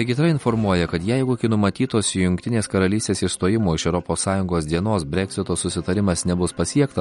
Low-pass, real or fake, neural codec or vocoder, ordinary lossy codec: 19.8 kHz; real; none; MP3, 48 kbps